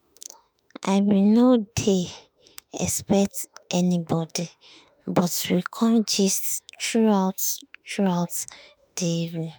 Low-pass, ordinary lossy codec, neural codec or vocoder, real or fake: none; none; autoencoder, 48 kHz, 32 numbers a frame, DAC-VAE, trained on Japanese speech; fake